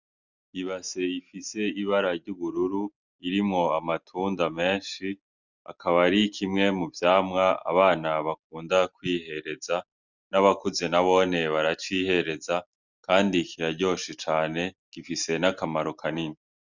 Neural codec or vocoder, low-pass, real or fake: none; 7.2 kHz; real